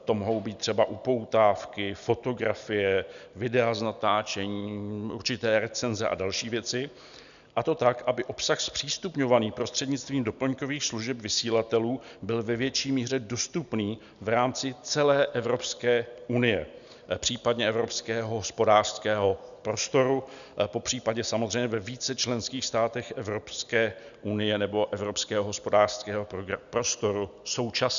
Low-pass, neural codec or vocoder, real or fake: 7.2 kHz; none; real